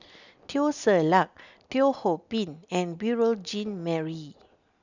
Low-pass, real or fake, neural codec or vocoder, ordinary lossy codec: 7.2 kHz; real; none; none